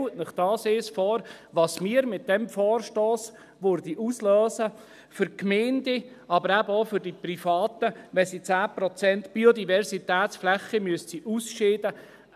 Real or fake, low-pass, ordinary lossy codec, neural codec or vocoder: real; 14.4 kHz; none; none